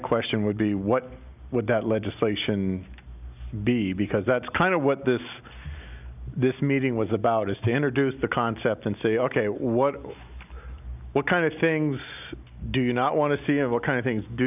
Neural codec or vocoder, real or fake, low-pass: none; real; 3.6 kHz